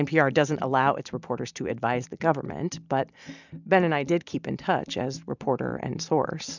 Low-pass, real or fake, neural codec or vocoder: 7.2 kHz; real; none